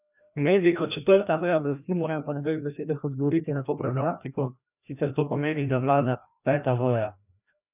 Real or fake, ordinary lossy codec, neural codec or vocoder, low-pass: fake; none; codec, 16 kHz, 1 kbps, FreqCodec, larger model; 3.6 kHz